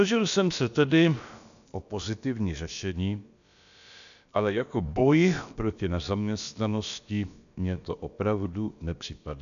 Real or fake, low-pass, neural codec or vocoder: fake; 7.2 kHz; codec, 16 kHz, about 1 kbps, DyCAST, with the encoder's durations